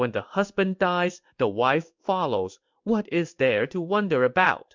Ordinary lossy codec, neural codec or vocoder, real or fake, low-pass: MP3, 64 kbps; codec, 16 kHz in and 24 kHz out, 1 kbps, XY-Tokenizer; fake; 7.2 kHz